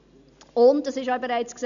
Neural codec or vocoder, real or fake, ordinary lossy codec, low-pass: none; real; none; 7.2 kHz